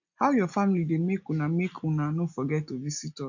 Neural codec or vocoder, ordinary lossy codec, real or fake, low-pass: none; none; real; 7.2 kHz